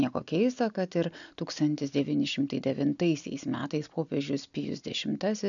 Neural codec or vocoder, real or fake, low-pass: none; real; 7.2 kHz